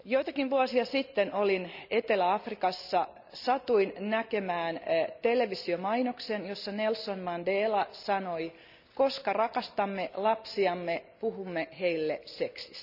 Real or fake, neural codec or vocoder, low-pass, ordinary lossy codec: real; none; 5.4 kHz; none